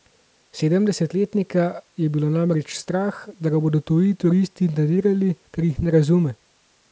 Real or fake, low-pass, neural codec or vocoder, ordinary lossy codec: real; none; none; none